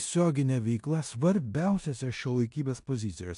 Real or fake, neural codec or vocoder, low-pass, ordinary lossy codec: fake; codec, 16 kHz in and 24 kHz out, 0.9 kbps, LongCat-Audio-Codec, four codebook decoder; 10.8 kHz; AAC, 64 kbps